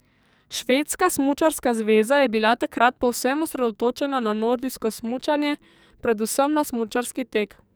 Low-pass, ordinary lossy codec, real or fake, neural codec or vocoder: none; none; fake; codec, 44.1 kHz, 2.6 kbps, SNAC